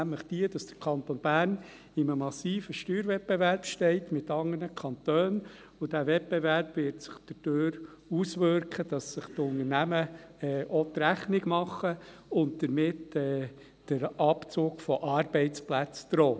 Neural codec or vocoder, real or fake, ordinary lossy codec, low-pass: none; real; none; none